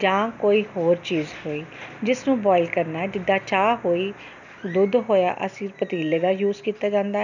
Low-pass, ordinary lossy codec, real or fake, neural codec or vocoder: 7.2 kHz; none; real; none